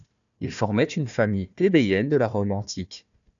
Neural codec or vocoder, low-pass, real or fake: codec, 16 kHz, 1 kbps, FunCodec, trained on Chinese and English, 50 frames a second; 7.2 kHz; fake